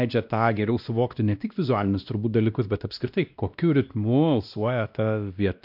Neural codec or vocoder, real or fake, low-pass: codec, 16 kHz, 1 kbps, X-Codec, WavLM features, trained on Multilingual LibriSpeech; fake; 5.4 kHz